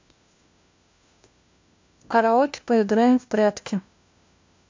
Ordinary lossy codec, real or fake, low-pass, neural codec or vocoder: MP3, 64 kbps; fake; 7.2 kHz; codec, 16 kHz, 1 kbps, FunCodec, trained on LibriTTS, 50 frames a second